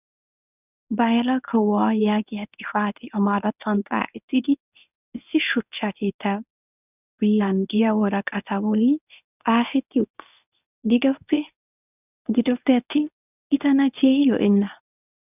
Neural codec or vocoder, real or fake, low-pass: codec, 24 kHz, 0.9 kbps, WavTokenizer, medium speech release version 1; fake; 3.6 kHz